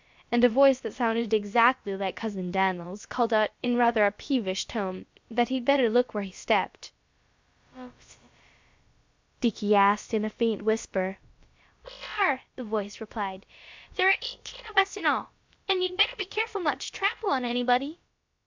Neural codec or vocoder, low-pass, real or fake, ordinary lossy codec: codec, 16 kHz, about 1 kbps, DyCAST, with the encoder's durations; 7.2 kHz; fake; MP3, 64 kbps